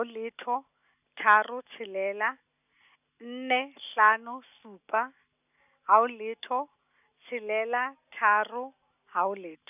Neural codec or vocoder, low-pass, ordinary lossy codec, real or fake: none; 3.6 kHz; none; real